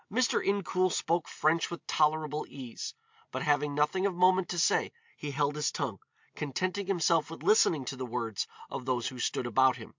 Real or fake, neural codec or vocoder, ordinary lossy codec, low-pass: real; none; MP3, 64 kbps; 7.2 kHz